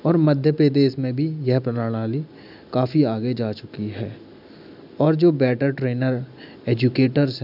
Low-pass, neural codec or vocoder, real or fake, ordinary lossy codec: 5.4 kHz; none; real; none